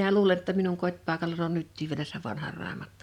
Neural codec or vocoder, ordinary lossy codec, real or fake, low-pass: vocoder, 44.1 kHz, 128 mel bands every 512 samples, BigVGAN v2; none; fake; 19.8 kHz